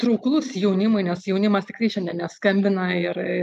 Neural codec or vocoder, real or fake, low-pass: none; real; 14.4 kHz